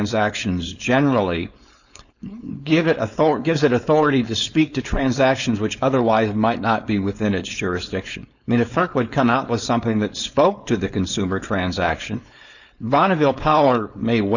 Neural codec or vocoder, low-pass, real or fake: codec, 16 kHz, 4.8 kbps, FACodec; 7.2 kHz; fake